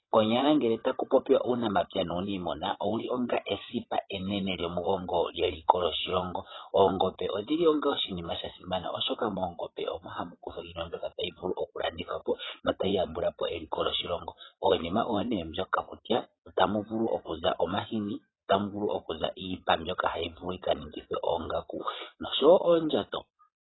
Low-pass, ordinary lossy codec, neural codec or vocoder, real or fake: 7.2 kHz; AAC, 16 kbps; vocoder, 22.05 kHz, 80 mel bands, Vocos; fake